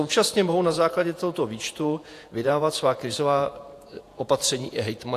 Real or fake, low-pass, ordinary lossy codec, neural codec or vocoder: fake; 14.4 kHz; AAC, 64 kbps; vocoder, 48 kHz, 128 mel bands, Vocos